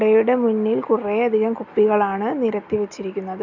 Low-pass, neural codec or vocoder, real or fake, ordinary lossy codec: 7.2 kHz; none; real; none